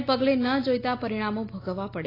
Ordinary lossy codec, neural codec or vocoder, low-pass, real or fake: AAC, 24 kbps; none; 5.4 kHz; real